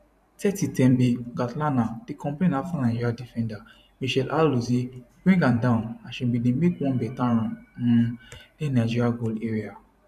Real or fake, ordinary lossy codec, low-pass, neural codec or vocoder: fake; none; 14.4 kHz; vocoder, 44.1 kHz, 128 mel bands every 512 samples, BigVGAN v2